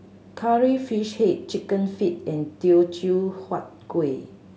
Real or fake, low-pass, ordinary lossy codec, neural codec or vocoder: real; none; none; none